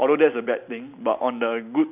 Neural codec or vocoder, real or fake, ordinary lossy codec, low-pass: none; real; none; 3.6 kHz